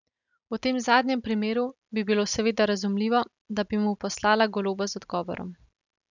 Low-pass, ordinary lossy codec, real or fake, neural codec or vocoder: 7.2 kHz; none; real; none